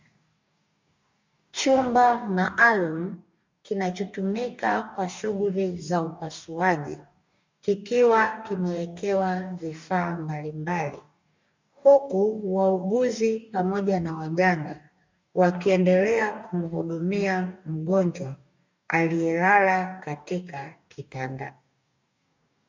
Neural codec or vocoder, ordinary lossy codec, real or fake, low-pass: codec, 44.1 kHz, 2.6 kbps, DAC; MP3, 64 kbps; fake; 7.2 kHz